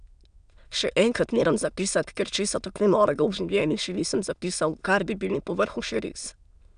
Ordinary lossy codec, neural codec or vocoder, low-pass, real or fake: none; autoencoder, 22.05 kHz, a latent of 192 numbers a frame, VITS, trained on many speakers; 9.9 kHz; fake